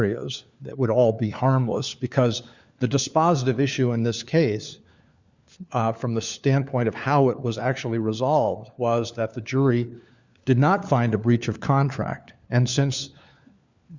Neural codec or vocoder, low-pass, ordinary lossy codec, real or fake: codec, 16 kHz, 4 kbps, FunCodec, trained on LibriTTS, 50 frames a second; 7.2 kHz; Opus, 64 kbps; fake